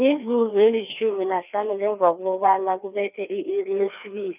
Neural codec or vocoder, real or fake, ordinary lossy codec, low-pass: codec, 16 kHz, 2 kbps, FreqCodec, larger model; fake; none; 3.6 kHz